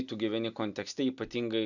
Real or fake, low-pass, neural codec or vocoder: real; 7.2 kHz; none